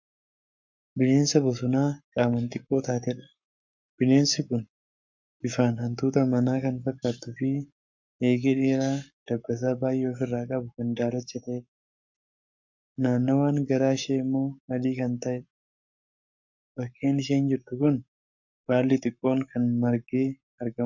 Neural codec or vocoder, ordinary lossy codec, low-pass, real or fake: codec, 44.1 kHz, 7.8 kbps, Pupu-Codec; MP3, 64 kbps; 7.2 kHz; fake